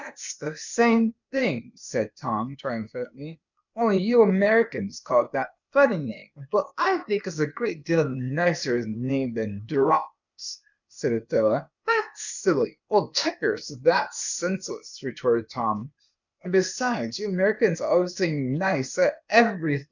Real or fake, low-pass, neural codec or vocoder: fake; 7.2 kHz; codec, 16 kHz, 0.8 kbps, ZipCodec